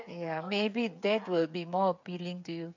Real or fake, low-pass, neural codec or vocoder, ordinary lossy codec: fake; 7.2 kHz; codec, 16 kHz, 8 kbps, FreqCodec, smaller model; MP3, 64 kbps